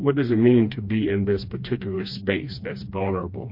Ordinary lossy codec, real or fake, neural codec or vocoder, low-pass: MP3, 32 kbps; fake; codec, 16 kHz, 2 kbps, FreqCodec, smaller model; 5.4 kHz